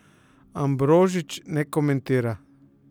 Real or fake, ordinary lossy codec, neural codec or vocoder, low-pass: real; none; none; 19.8 kHz